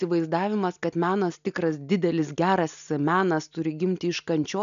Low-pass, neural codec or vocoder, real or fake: 7.2 kHz; none; real